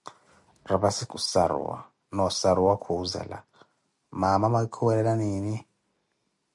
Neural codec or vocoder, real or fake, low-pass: none; real; 10.8 kHz